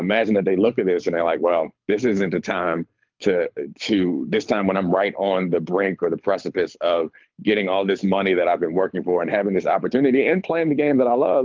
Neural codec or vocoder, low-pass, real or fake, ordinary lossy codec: codec, 24 kHz, 6 kbps, HILCodec; 7.2 kHz; fake; Opus, 32 kbps